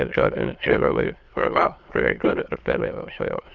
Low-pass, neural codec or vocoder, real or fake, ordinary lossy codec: 7.2 kHz; autoencoder, 22.05 kHz, a latent of 192 numbers a frame, VITS, trained on many speakers; fake; Opus, 32 kbps